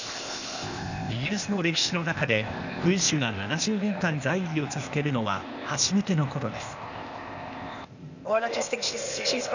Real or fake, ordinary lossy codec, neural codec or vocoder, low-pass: fake; none; codec, 16 kHz, 0.8 kbps, ZipCodec; 7.2 kHz